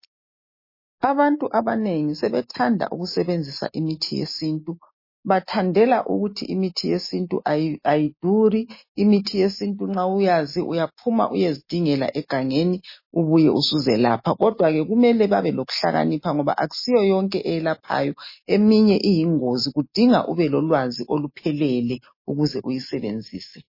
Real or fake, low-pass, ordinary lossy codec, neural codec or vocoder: real; 5.4 kHz; MP3, 24 kbps; none